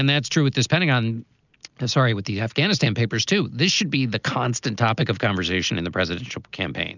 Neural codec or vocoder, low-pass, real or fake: none; 7.2 kHz; real